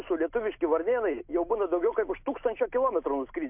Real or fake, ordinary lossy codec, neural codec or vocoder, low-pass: real; MP3, 32 kbps; none; 3.6 kHz